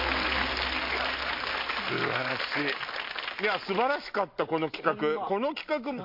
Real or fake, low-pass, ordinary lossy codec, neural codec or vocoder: real; 5.4 kHz; MP3, 32 kbps; none